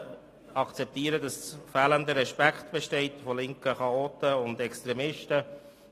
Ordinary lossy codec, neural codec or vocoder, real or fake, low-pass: AAC, 48 kbps; none; real; 14.4 kHz